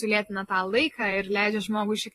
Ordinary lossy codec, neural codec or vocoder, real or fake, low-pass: AAC, 48 kbps; vocoder, 44.1 kHz, 128 mel bands every 512 samples, BigVGAN v2; fake; 14.4 kHz